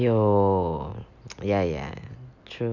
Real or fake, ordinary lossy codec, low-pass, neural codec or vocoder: real; none; 7.2 kHz; none